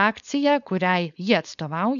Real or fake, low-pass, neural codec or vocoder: fake; 7.2 kHz; codec, 16 kHz, 4.8 kbps, FACodec